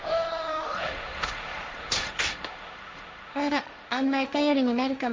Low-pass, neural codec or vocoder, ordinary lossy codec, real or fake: none; codec, 16 kHz, 1.1 kbps, Voila-Tokenizer; none; fake